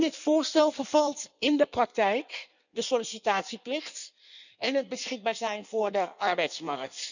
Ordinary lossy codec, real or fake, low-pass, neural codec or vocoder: none; fake; 7.2 kHz; codec, 16 kHz in and 24 kHz out, 1.1 kbps, FireRedTTS-2 codec